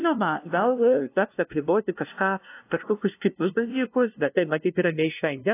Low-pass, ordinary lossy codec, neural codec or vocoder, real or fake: 3.6 kHz; AAC, 24 kbps; codec, 16 kHz, 0.5 kbps, FunCodec, trained on LibriTTS, 25 frames a second; fake